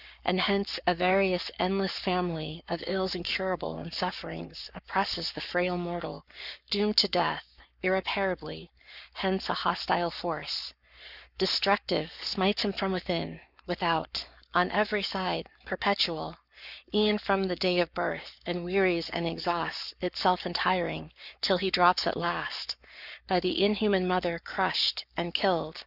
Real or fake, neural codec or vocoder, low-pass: fake; codec, 44.1 kHz, 7.8 kbps, Pupu-Codec; 5.4 kHz